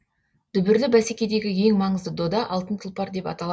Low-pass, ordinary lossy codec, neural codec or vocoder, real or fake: none; none; none; real